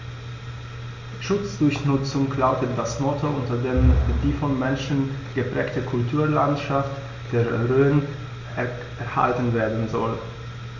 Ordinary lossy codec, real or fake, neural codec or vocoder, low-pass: MP3, 48 kbps; real; none; 7.2 kHz